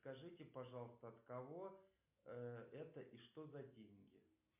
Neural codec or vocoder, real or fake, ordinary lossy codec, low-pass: none; real; MP3, 32 kbps; 3.6 kHz